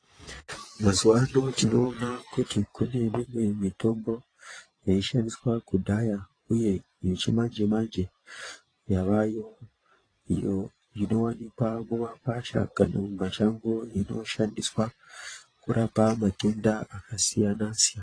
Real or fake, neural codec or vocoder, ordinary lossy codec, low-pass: fake; vocoder, 22.05 kHz, 80 mel bands, Vocos; AAC, 32 kbps; 9.9 kHz